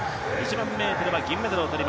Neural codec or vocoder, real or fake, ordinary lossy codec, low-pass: none; real; none; none